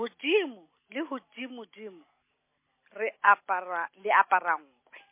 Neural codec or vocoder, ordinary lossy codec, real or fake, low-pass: none; MP3, 24 kbps; real; 3.6 kHz